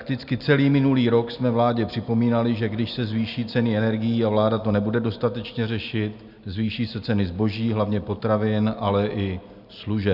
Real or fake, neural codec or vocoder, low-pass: real; none; 5.4 kHz